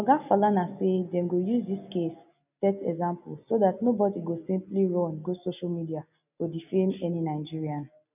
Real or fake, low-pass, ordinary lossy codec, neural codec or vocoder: real; 3.6 kHz; none; none